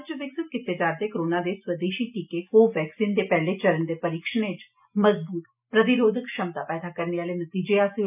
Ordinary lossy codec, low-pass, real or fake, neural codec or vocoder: none; 3.6 kHz; real; none